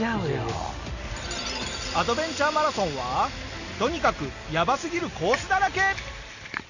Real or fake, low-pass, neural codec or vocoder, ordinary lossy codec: real; 7.2 kHz; none; none